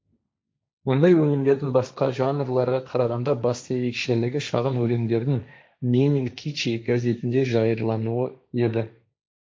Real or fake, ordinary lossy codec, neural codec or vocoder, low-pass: fake; none; codec, 16 kHz, 1.1 kbps, Voila-Tokenizer; none